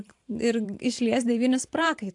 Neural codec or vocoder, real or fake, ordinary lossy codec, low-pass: vocoder, 44.1 kHz, 128 mel bands every 512 samples, BigVGAN v2; fake; AAC, 64 kbps; 10.8 kHz